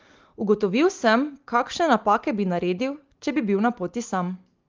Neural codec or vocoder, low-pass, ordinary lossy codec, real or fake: none; 7.2 kHz; Opus, 32 kbps; real